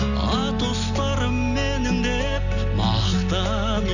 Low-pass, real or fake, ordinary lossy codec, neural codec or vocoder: 7.2 kHz; real; none; none